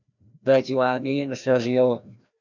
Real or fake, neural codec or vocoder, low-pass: fake; codec, 16 kHz, 1 kbps, FreqCodec, larger model; 7.2 kHz